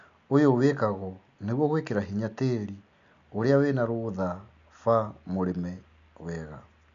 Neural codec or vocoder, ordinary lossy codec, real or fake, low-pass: none; AAC, 64 kbps; real; 7.2 kHz